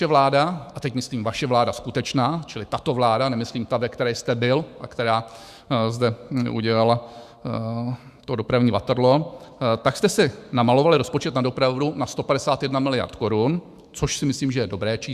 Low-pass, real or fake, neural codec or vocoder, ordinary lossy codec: 14.4 kHz; fake; autoencoder, 48 kHz, 128 numbers a frame, DAC-VAE, trained on Japanese speech; Opus, 64 kbps